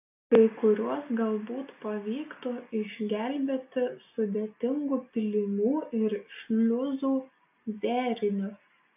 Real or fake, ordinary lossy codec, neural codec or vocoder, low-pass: real; AAC, 32 kbps; none; 3.6 kHz